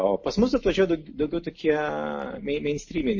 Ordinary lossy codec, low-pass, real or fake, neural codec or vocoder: MP3, 32 kbps; 7.2 kHz; real; none